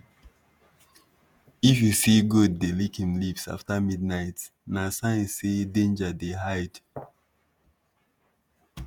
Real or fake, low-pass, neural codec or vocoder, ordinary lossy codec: fake; none; vocoder, 48 kHz, 128 mel bands, Vocos; none